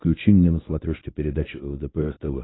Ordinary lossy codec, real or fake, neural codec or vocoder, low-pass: AAC, 16 kbps; fake; codec, 24 kHz, 0.9 kbps, WavTokenizer, medium speech release version 1; 7.2 kHz